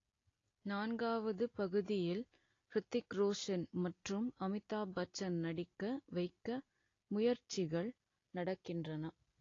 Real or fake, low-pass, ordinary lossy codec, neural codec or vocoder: real; 7.2 kHz; AAC, 32 kbps; none